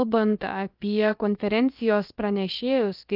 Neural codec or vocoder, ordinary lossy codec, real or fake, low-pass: codec, 16 kHz, about 1 kbps, DyCAST, with the encoder's durations; Opus, 24 kbps; fake; 5.4 kHz